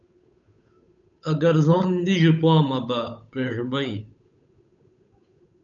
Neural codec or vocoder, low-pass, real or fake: codec, 16 kHz, 8 kbps, FunCodec, trained on Chinese and English, 25 frames a second; 7.2 kHz; fake